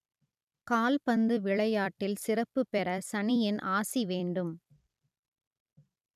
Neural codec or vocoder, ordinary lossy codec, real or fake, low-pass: vocoder, 44.1 kHz, 128 mel bands every 256 samples, BigVGAN v2; none; fake; 14.4 kHz